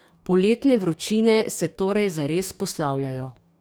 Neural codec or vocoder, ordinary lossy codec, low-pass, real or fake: codec, 44.1 kHz, 2.6 kbps, DAC; none; none; fake